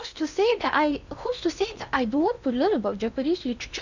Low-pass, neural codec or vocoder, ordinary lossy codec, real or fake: 7.2 kHz; codec, 16 kHz in and 24 kHz out, 0.8 kbps, FocalCodec, streaming, 65536 codes; none; fake